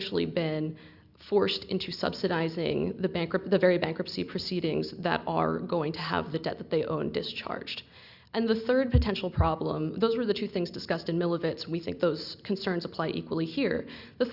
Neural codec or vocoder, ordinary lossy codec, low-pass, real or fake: none; Opus, 64 kbps; 5.4 kHz; real